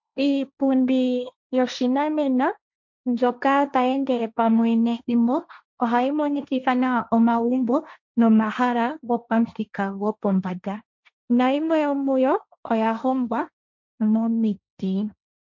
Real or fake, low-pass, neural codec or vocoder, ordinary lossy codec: fake; 7.2 kHz; codec, 16 kHz, 1.1 kbps, Voila-Tokenizer; MP3, 48 kbps